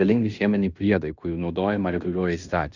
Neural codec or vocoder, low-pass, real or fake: codec, 16 kHz in and 24 kHz out, 0.9 kbps, LongCat-Audio-Codec, fine tuned four codebook decoder; 7.2 kHz; fake